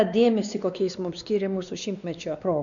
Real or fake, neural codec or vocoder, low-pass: fake; codec, 16 kHz, 2 kbps, X-Codec, WavLM features, trained on Multilingual LibriSpeech; 7.2 kHz